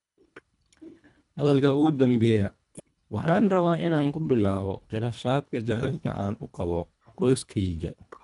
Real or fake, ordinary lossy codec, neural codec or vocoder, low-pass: fake; none; codec, 24 kHz, 1.5 kbps, HILCodec; 10.8 kHz